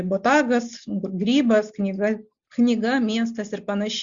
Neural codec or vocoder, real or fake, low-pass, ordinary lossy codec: none; real; 7.2 kHz; Opus, 64 kbps